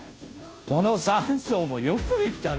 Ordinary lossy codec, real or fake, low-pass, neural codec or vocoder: none; fake; none; codec, 16 kHz, 0.5 kbps, FunCodec, trained on Chinese and English, 25 frames a second